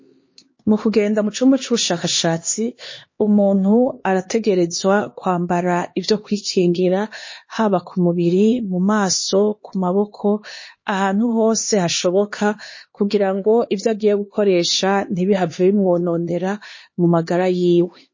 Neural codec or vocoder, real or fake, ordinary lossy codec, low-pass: codec, 16 kHz, 2 kbps, X-Codec, HuBERT features, trained on LibriSpeech; fake; MP3, 32 kbps; 7.2 kHz